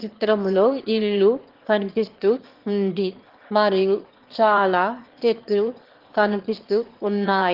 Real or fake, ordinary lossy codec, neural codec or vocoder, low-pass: fake; Opus, 16 kbps; autoencoder, 22.05 kHz, a latent of 192 numbers a frame, VITS, trained on one speaker; 5.4 kHz